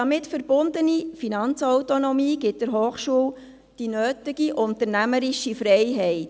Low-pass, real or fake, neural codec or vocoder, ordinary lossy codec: none; real; none; none